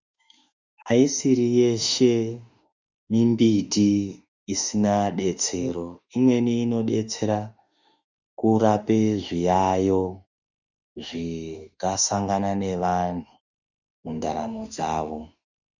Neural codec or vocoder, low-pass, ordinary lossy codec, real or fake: autoencoder, 48 kHz, 32 numbers a frame, DAC-VAE, trained on Japanese speech; 7.2 kHz; Opus, 64 kbps; fake